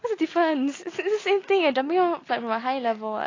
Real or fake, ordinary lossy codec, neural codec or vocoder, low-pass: real; AAC, 32 kbps; none; 7.2 kHz